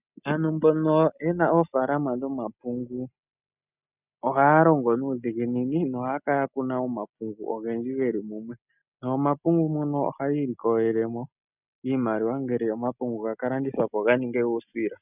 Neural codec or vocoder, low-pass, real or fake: none; 3.6 kHz; real